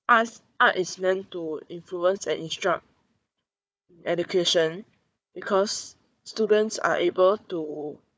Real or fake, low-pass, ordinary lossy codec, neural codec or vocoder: fake; none; none; codec, 16 kHz, 4 kbps, FunCodec, trained on Chinese and English, 50 frames a second